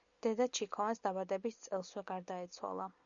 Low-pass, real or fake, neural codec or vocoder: 7.2 kHz; real; none